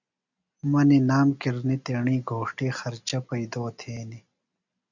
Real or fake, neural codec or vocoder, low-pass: real; none; 7.2 kHz